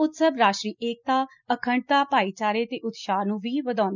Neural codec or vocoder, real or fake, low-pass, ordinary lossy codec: none; real; 7.2 kHz; none